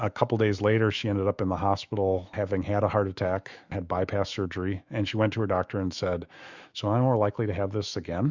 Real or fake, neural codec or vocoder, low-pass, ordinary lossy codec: fake; autoencoder, 48 kHz, 128 numbers a frame, DAC-VAE, trained on Japanese speech; 7.2 kHz; Opus, 64 kbps